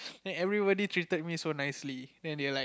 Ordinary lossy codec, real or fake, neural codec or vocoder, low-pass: none; real; none; none